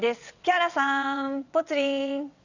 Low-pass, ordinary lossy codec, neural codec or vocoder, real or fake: 7.2 kHz; none; vocoder, 22.05 kHz, 80 mel bands, WaveNeXt; fake